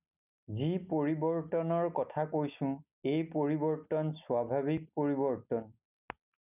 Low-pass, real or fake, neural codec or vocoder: 3.6 kHz; real; none